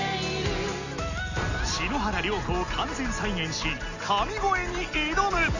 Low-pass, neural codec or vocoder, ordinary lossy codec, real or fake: 7.2 kHz; none; none; real